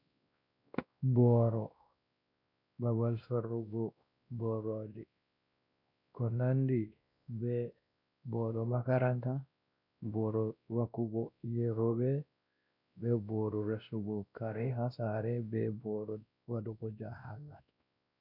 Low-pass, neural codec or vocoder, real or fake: 5.4 kHz; codec, 16 kHz, 1 kbps, X-Codec, WavLM features, trained on Multilingual LibriSpeech; fake